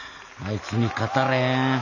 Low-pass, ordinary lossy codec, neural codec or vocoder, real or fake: 7.2 kHz; none; none; real